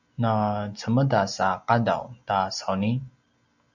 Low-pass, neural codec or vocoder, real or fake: 7.2 kHz; none; real